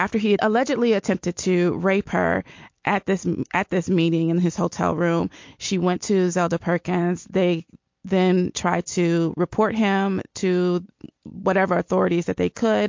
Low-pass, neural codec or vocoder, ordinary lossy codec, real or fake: 7.2 kHz; none; MP3, 48 kbps; real